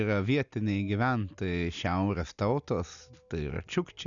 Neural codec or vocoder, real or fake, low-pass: none; real; 7.2 kHz